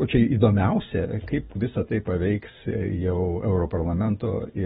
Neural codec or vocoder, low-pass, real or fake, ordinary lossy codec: none; 14.4 kHz; real; AAC, 16 kbps